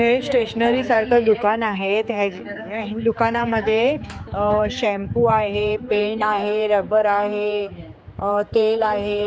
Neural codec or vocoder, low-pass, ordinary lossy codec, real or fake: codec, 16 kHz, 4 kbps, X-Codec, HuBERT features, trained on general audio; none; none; fake